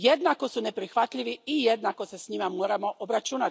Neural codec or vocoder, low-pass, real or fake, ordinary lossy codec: none; none; real; none